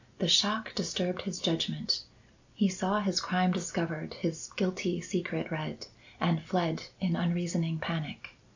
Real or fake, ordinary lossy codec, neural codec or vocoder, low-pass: real; AAC, 48 kbps; none; 7.2 kHz